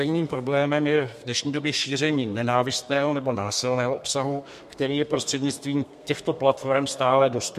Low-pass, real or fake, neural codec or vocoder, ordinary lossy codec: 14.4 kHz; fake; codec, 32 kHz, 1.9 kbps, SNAC; MP3, 64 kbps